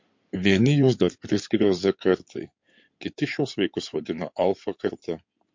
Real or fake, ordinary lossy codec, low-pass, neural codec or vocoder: fake; MP3, 48 kbps; 7.2 kHz; codec, 16 kHz in and 24 kHz out, 2.2 kbps, FireRedTTS-2 codec